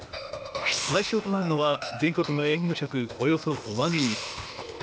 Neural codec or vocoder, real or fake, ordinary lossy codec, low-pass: codec, 16 kHz, 0.8 kbps, ZipCodec; fake; none; none